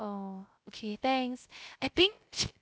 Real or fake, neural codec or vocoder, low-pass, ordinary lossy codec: fake; codec, 16 kHz, 0.3 kbps, FocalCodec; none; none